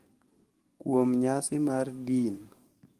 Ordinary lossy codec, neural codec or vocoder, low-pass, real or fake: Opus, 24 kbps; codec, 44.1 kHz, 7.8 kbps, DAC; 14.4 kHz; fake